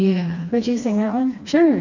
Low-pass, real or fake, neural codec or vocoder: 7.2 kHz; fake; codec, 16 kHz, 2 kbps, FreqCodec, smaller model